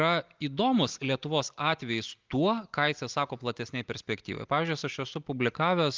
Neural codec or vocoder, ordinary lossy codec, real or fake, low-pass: none; Opus, 32 kbps; real; 7.2 kHz